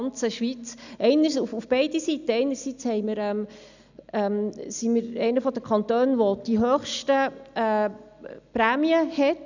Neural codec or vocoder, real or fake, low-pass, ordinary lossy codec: none; real; 7.2 kHz; none